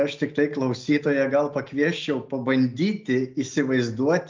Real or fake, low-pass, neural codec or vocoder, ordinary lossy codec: real; 7.2 kHz; none; Opus, 24 kbps